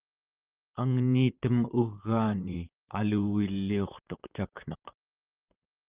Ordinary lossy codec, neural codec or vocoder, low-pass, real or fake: Opus, 32 kbps; vocoder, 44.1 kHz, 128 mel bands, Pupu-Vocoder; 3.6 kHz; fake